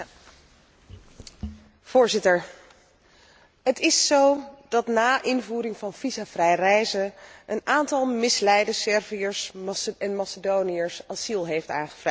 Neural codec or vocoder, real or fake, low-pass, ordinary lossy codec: none; real; none; none